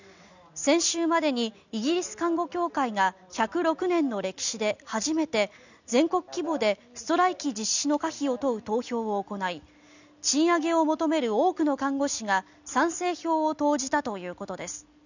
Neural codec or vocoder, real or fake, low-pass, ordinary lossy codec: none; real; 7.2 kHz; none